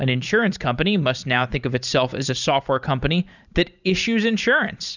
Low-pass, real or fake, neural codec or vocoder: 7.2 kHz; fake; vocoder, 44.1 kHz, 128 mel bands every 512 samples, BigVGAN v2